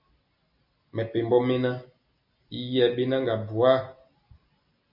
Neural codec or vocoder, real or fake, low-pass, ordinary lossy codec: none; real; 5.4 kHz; MP3, 48 kbps